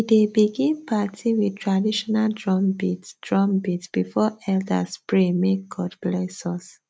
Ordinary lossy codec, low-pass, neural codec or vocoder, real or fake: none; none; none; real